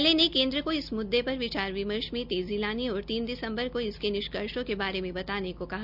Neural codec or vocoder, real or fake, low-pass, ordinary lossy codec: none; real; 5.4 kHz; none